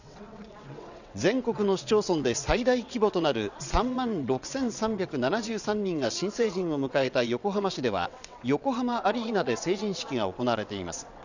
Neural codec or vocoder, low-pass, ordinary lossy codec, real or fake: vocoder, 22.05 kHz, 80 mel bands, WaveNeXt; 7.2 kHz; none; fake